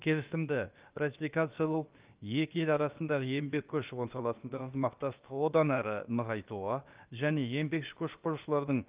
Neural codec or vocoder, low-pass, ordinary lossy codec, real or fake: codec, 16 kHz, about 1 kbps, DyCAST, with the encoder's durations; 3.6 kHz; Opus, 32 kbps; fake